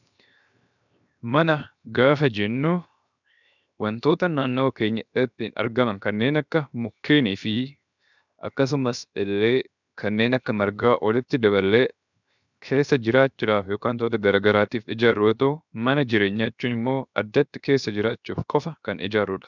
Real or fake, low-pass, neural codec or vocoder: fake; 7.2 kHz; codec, 16 kHz, 0.7 kbps, FocalCodec